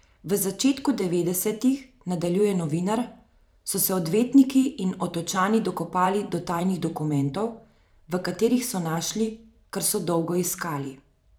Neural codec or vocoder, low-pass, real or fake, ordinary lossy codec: vocoder, 44.1 kHz, 128 mel bands every 512 samples, BigVGAN v2; none; fake; none